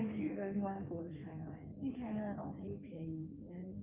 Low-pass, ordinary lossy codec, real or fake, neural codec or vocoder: 3.6 kHz; Opus, 32 kbps; fake; codec, 16 kHz in and 24 kHz out, 1.1 kbps, FireRedTTS-2 codec